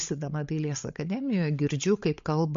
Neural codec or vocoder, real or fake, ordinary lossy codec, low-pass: codec, 16 kHz, 16 kbps, FreqCodec, larger model; fake; MP3, 48 kbps; 7.2 kHz